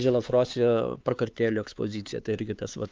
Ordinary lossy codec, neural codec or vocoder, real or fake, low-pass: Opus, 32 kbps; codec, 16 kHz, 4 kbps, X-Codec, HuBERT features, trained on LibriSpeech; fake; 7.2 kHz